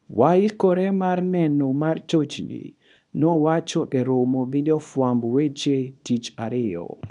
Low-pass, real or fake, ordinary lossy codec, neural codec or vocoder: 10.8 kHz; fake; none; codec, 24 kHz, 0.9 kbps, WavTokenizer, small release